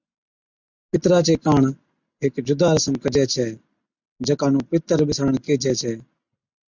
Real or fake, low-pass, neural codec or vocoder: real; 7.2 kHz; none